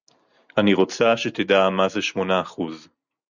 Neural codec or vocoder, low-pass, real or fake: none; 7.2 kHz; real